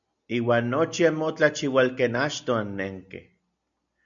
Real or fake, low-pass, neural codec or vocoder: real; 7.2 kHz; none